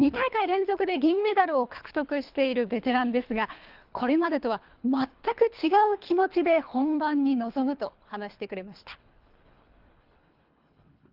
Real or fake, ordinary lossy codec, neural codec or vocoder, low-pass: fake; Opus, 32 kbps; codec, 24 kHz, 3 kbps, HILCodec; 5.4 kHz